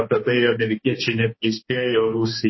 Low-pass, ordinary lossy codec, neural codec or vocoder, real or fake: 7.2 kHz; MP3, 24 kbps; codec, 16 kHz, 2 kbps, X-Codec, HuBERT features, trained on balanced general audio; fake